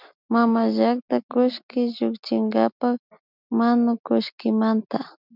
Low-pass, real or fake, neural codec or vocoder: 5.4 kHz; real; none